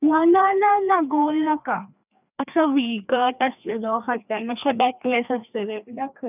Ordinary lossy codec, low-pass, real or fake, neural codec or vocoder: none; 3.6 kHz; fake; codec, 16 kHz, 2 kbps, FreqCodec, larger model